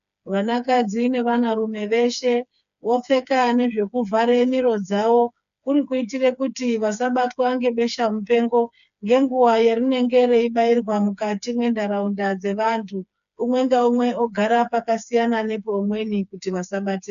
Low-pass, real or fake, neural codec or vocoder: 7.2 kHz; fake; codec, 16 kHz, 4 kbps, FreqCodec, smaller model